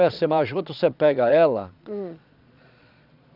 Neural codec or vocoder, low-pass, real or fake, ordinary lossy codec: vocoder, 22.05 kHz, 80 mel bands, Vocos; 5.4 kHz; fake; none